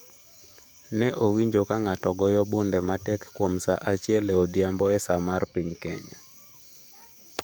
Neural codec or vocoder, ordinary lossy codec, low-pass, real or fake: codec, 44.1 kHz, 7.8 kbps, DAC; none; none; fake